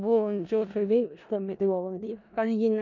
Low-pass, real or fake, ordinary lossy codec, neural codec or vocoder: 7.2 kHz; fake; none; codec, 16 kHz in and 24 kHz out, 0.4 kbps, LongCat-Audio-Codec, four codebook decoder